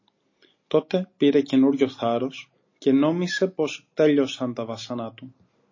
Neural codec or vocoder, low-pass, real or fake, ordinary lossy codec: none; 7.2 kHz; real; MP3, 32 kbps